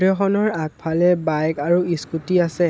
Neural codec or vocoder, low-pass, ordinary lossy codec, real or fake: none; none; none; real